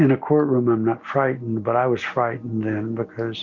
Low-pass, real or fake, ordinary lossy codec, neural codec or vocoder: 7.2 kHz; real; Opus, 64 kbps; none